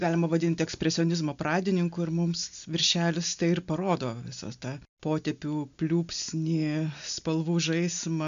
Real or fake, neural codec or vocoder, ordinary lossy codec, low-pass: real; none; MP3, 64 kbps; 7.2 kHz